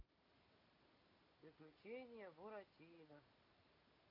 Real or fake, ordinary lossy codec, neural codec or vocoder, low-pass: real; MP3, 32 kbps; none; 5.4 kHz